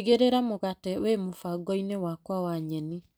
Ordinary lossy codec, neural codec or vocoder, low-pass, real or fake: none; none; none; real